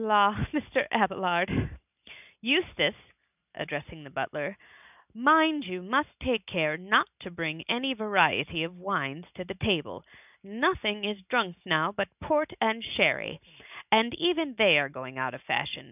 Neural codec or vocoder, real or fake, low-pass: none; real; 3.6 kHz